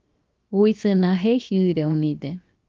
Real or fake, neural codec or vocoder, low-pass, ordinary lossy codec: fake; codec, 16 kHz, 0.7 kbps, FocalCodec; 7.2 kHz; Opus, 32 kbps